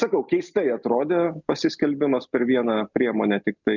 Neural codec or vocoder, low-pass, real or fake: none; 7.2 kHz; real